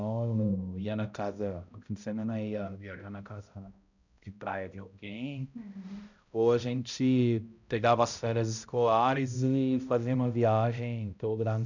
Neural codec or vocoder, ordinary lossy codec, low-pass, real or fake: codec, 16 kHz, 0.5 kbps, X-Codec, HuBERT features, trained on balanced general audio; none; 7.2 kHz; fake